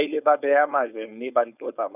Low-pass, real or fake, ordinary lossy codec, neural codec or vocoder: 3.6 kHz; fake; none; codec, 16 kHz, 4.8 kbps, FACodec